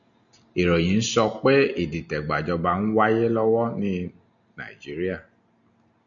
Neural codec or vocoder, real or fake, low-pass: none; real; 7.2 kHz